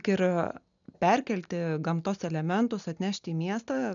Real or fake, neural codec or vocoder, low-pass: real; none; 7.2 kHz